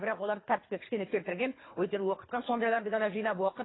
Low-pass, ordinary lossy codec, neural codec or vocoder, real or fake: 7.2 kHz; AAC, 16 kbps; codec, 24 kHz, 3 kbps, HILCodec; fake